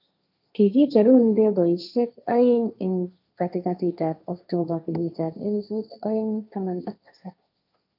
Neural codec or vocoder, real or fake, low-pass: codec, 16 kHz, 1.1 kbps, Voila-Tokenizer; fake; 5.4 kHz